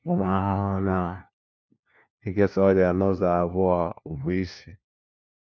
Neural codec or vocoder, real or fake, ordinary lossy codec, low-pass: codec, 16 kHz, 1 kbps, FunCodec, trained on LibriTTS, 50 frames a second; fake; none; none